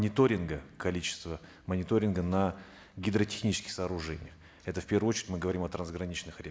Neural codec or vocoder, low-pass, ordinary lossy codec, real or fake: none; none; none; real